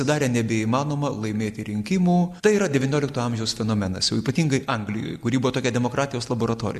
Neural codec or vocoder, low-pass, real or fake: none; 14.4 kHz; real